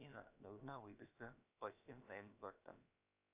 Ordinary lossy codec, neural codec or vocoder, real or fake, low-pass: AAC, 32 kbps; codec, 16 kHz, about 1 kbps, DyCAST, with the encoder's durations; fake; 3.6 kHz